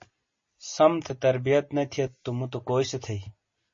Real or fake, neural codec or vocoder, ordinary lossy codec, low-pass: real; none; MP3, 32 kbps; 7.2 kHz